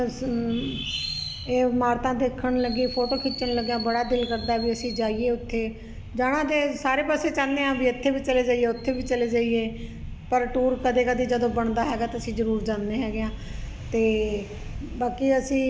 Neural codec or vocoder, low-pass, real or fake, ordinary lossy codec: none; none; real; none